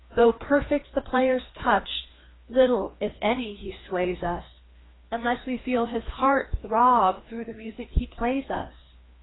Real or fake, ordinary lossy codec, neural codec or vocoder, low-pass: fake; AAC, 16 kbps; codec, 16 kHz, 2 kbps, FreqCodec, larger model; 7.2 kHz